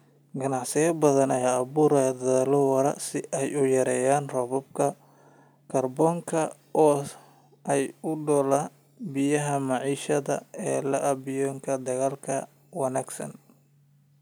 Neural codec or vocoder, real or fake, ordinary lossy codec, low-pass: none; real; none; none